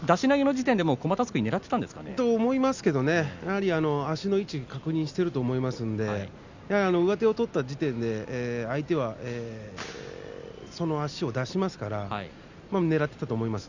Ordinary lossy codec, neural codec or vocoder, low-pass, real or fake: Opus, 64 kbps; none; 7.2 kHz; real